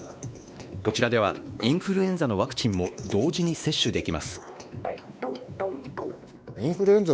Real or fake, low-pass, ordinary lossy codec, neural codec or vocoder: fake; none; none; codec, 16 kHz, 2 kbps, X-Codec, WavLM features, trained on Multilingual LibriSpeech